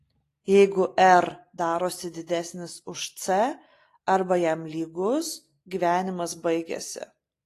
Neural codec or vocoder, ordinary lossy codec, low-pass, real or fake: none; AAC, 48 kbps; 14.4 kHz; real